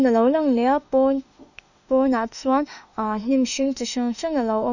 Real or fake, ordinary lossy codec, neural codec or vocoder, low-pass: fake; none; autoencoder, 48 kHz, 32 numbers a frame, DAC-VAE, trained on Japanese speech; 7.2 kHz